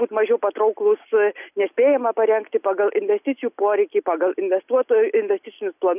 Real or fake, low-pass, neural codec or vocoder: real; 3.6 kHz; none